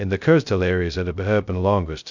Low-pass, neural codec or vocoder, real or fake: 7.2 kHz; codec, 16 kHz, 0.2 kbps, FocalCodec; fake